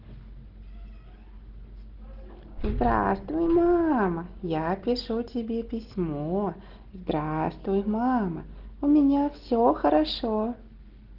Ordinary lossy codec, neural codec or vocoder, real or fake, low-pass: Opus, 16 kbps; none; real; 5.4 kHz